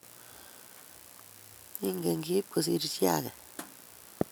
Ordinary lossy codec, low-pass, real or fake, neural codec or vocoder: none; none; real; none